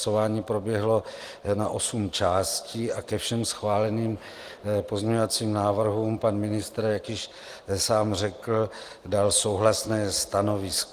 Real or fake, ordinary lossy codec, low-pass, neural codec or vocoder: real; Opus, 24 kbps; 14.4 kHz; none